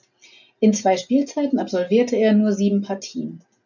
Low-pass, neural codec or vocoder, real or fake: 7.2 kHz; none; real